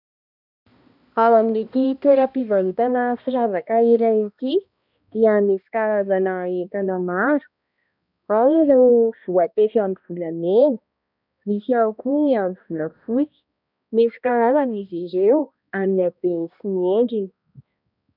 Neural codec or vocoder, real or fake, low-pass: codec, 16 kHz, 1 kbps, X-Codec, HuBERT features, trained on balanced general audio; fake; 5.4 kHz